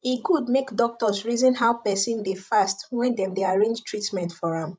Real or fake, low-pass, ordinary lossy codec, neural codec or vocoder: fake; none; none; codec, 16 kHz, 16 kbps, FreqCodec, larger model